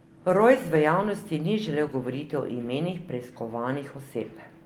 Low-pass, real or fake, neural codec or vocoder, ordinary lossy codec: 19.8 kHz; real; none; Opus, 24 kbps